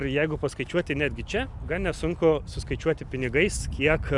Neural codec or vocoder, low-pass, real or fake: none; 10.8 kHz; real